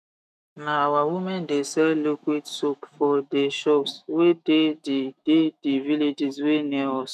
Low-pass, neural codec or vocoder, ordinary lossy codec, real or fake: 14.4 kHz; autoencoder, 48 kHz, 128 numbers a frame, DAC-VAE, trained on Japanese speech; none; fake